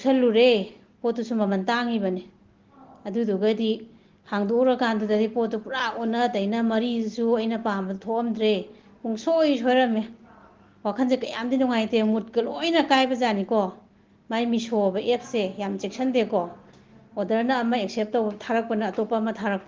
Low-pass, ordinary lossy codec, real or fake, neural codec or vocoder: 7.2 kHz; Opus, 16 kbps; real; none